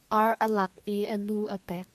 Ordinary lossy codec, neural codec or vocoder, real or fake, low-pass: MP3, 96 kbps; codec, 32 kHz, 1.9 kbps, SNAC; fake; 14.4 kHz